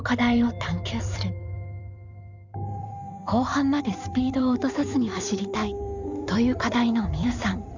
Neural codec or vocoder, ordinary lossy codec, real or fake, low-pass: codec, 16 kHz in and 24 kHz out, 2.2 kbps, FireRedTTS-2 codec; none; fake; 7.2 kHz